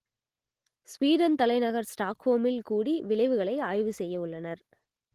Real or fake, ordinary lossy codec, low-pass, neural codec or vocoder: real; Opus, 16 kbps; 14.4 kHz; none